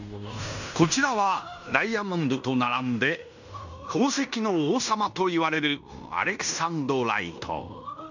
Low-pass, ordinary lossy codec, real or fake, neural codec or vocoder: 7.2 kHz; none; fake; codec, 16 kHz in and 24 kHz out, 0.9 kbps, LongCat-Audio-Codec, fine tuned four codebook decoder